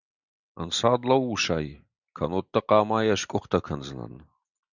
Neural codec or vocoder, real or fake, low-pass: none; real; 7.2 kHz